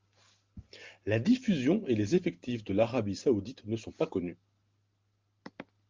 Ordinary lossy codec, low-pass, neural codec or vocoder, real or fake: Opus, 24 kbps; 7.2 kHz; none; real